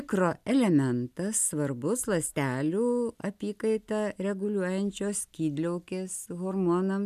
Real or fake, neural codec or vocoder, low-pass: real; none; 14.4 kHz